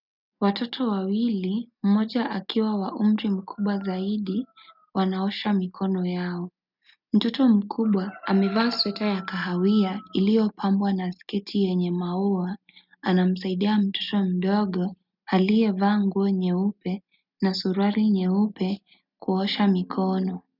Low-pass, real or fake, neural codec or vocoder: 5.4 kHz; real; none